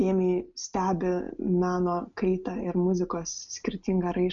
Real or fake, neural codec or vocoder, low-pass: real; none; 7.2 kHz